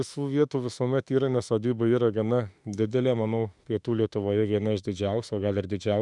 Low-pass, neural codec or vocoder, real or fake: 10.8 kHz; autoencoder, 48 kHz, 32 numbers a frame, DAC-VAE, trained on Japanese speech; fake